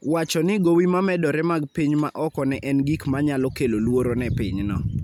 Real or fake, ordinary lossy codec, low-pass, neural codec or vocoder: real; none; 19.8 kHz; none